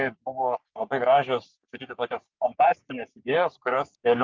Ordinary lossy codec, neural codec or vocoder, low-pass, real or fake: Opus, 24 kbps; codec, 44.1 kHz, 3.4 kbps, Pupu-Codec; 7.2 kHz; fake